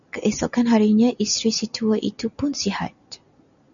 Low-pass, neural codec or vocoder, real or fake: 7.2 kHz; none; real